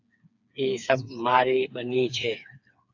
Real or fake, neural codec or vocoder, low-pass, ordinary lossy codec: fake; codec, 16 kHz, 4 kbps, FreqCodec, smaller model; 7.2 kHz; AAC, 48 kbps